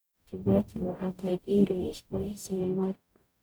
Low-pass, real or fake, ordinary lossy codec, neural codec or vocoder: none; fake; none; codec, 44.1 kHz, 0.9 kbps, DAC